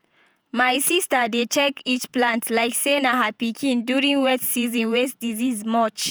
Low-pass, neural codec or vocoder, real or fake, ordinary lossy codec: none; vocoder, 48 kHz, 128 mel bands, Vocos; fake; none